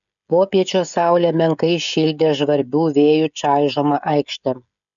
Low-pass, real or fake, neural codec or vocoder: 7.2 kHz; fake; codec, 16 kHz, 16 kbps, FreqCodec, smaller model